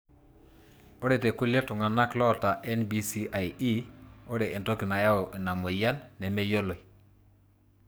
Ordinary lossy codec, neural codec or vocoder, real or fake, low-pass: none; codec, 44.1 kHz, 7.8 kbps, DAC; fake; none